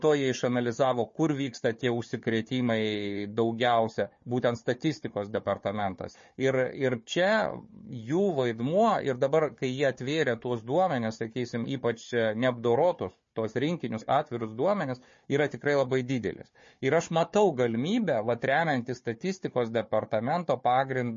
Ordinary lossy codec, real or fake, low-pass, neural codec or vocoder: MP3, 32 kbps; fake; 7.2 kHz; codec, 16 kHz, 4 kbps, FunCodec, trained on Chinese and English, 50 frames a second